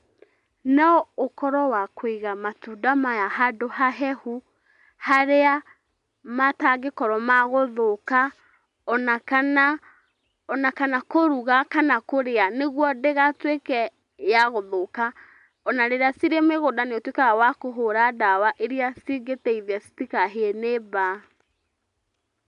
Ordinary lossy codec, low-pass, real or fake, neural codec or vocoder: AAC, 96 kbps; 10.8 kHz; real; none